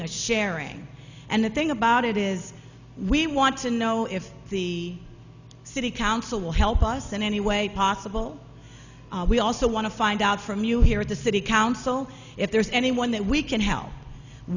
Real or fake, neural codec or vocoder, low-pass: real; none; 7.2 kHz